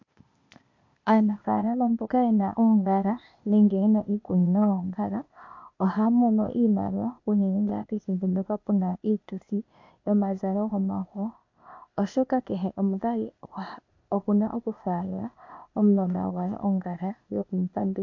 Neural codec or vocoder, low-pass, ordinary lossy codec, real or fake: codec, 16 kHz, 0.8 kbps, ZipCodec; 7.2 kHz; MP3, 48 kbps; fake